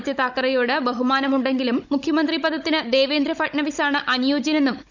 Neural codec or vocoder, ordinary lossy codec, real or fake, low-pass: codec, 16 kHz, 16 kbps, FunCodec, trained on Chinese and English, 50 frames a second; none; fake; 7.2 kHz